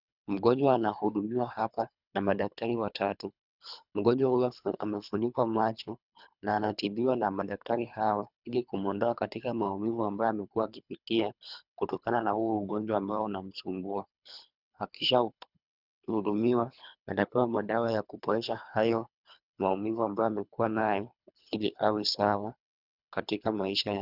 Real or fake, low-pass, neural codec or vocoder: fake; 5.4 kHz; codec, 24 kHz, 3 kbps, HILCodec